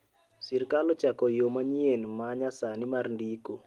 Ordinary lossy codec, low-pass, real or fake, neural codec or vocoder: Opus, 24 kbps; 19.8 kHz; real; none